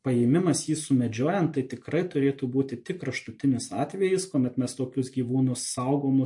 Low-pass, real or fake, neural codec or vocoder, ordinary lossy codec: 10.8 kHz; real; none; MP3, 48 kbps